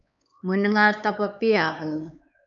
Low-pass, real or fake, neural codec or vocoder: 7.2 kHz; fake; codec, 16 kHz, 4 kbps, X-Codec, HuBERT features, trained on LibriSpeech